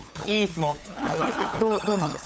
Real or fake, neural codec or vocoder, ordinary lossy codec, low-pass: fake; codec, 16 kHz, 8 kbps, FunCodec, trained on LibriTTS, 25 frames a second; none; none